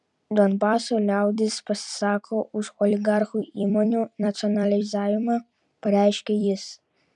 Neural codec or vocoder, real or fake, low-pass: vocoder, 44.1 kHz, 128 mel bands every 256 samples, BigVGAN v2; fake; 10.8 kHz